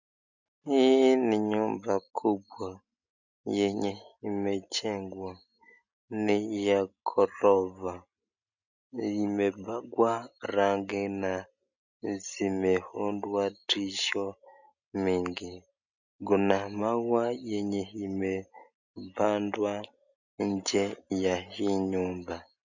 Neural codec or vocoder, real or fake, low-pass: none; real; 7.2 kHz